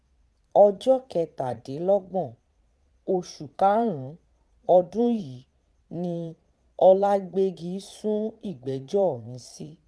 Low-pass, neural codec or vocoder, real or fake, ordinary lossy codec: none; vocoder, 22.05 kHz, 80 mel bands, Vocos; fake; none